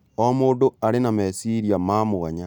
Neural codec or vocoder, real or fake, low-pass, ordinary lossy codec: none; real; 19.8 kHz; none